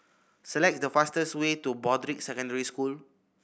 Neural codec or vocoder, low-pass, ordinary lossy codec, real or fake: none; none; none; real